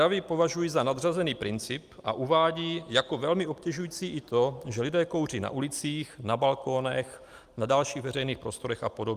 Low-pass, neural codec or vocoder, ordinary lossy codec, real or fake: 14.4 kHz; none; Opus, 32 kbps; real